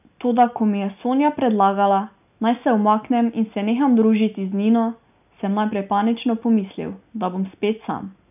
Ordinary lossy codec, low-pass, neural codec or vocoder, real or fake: none; 3.6 kHz; none; real